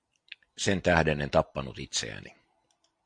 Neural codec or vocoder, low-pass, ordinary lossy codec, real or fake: none; 9.9 kHz; MP3, 64 kbps; real